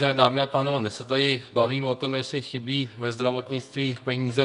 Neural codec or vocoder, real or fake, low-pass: codec, 24 kHz, 0.9 kbps, WavTokenizer, medium music audio release; fake; 10.8 kHz